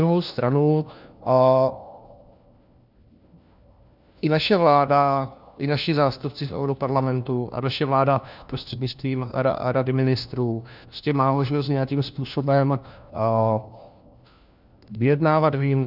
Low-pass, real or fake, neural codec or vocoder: 5.4 kHz; fake; codec, 16 kHz, 1 kbps, FunCodec, trained on LibriTTS, 50 frames a second